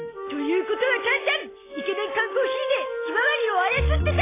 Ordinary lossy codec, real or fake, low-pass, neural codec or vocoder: AAC, 16 kbps; real; 3.6 kHz; none